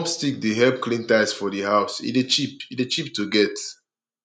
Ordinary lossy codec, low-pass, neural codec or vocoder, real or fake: none; 10.8 kHz; none; real